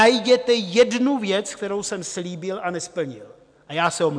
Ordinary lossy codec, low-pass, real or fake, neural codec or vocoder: MP3, 96 kbps; 9.9 kHz; real; none